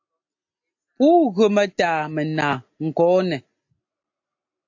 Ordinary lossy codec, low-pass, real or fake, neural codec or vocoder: AAC, 48 kbps; 7.2 kHz; real; none